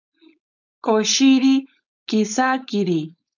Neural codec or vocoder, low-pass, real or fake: codec, 16 kHz, 4.8 kbps, FACodec; 7.2 kHz; fake